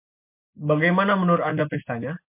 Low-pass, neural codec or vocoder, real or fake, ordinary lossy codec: 3.6 kHz; none; real; MP3, 32 kbps